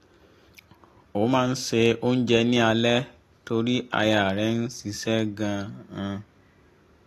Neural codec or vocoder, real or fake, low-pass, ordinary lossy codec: none; real; 14.4 kHz; AAC, 48 kbps